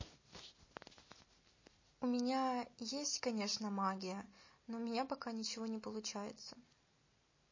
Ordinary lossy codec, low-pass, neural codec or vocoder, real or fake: MP3, 32 kbps; 7.2 kHz; none; real